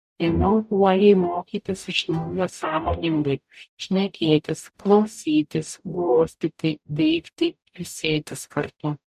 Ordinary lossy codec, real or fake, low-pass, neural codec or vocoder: MP3, 96 kbps; fake; 14.4 kHz; codec, 44.1 kHz, 0.9 kbps, DAC